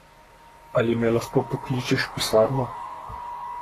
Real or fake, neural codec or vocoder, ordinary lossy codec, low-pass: fake; codec, 44.1 kHz, 3.4 kbps, Pupu-Codec; AAC, 48 kbps; 14.4 kHz